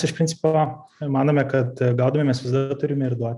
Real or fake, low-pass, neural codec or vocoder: real; 10.8 kHz; none